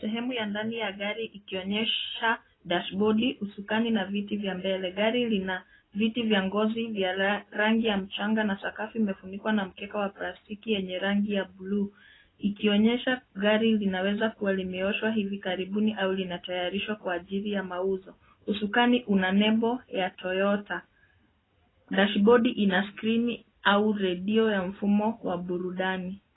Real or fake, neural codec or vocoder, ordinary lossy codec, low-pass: real; none; AAC, 16 kbps; 7.2 kHz